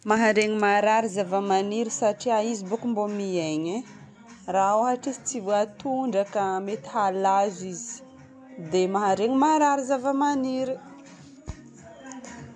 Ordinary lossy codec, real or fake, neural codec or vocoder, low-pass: none; real; none; none